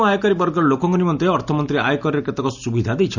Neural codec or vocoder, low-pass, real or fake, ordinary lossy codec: none; 7.2 kHz; real; none